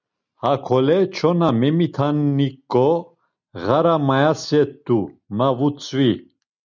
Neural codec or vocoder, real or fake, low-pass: none; real; 7.2 kHz